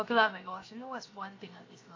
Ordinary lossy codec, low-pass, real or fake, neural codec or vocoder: AAC, 32 kbps; 7.2 kHz; fake; codec, 16 kHz, 0.7 kbps, FocalCodec